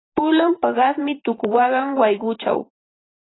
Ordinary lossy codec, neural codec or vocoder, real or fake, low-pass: AAC, 16 kbps; vocoder, 44.1 kHz, 128 mel bands every 512 samples, BigVGAN v2; fake; 7.2 kHz